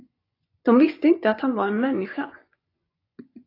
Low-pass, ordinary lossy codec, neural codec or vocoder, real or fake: 5.4 kHz; AAC, 32 kbps; vocoder, 24 kHz, 100 mel bands, Vocos; fake